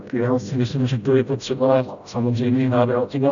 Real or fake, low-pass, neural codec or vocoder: fake; 7.2 kHz; codec, 16 kHz, 0.5 kbps, FreqCodec, smaller model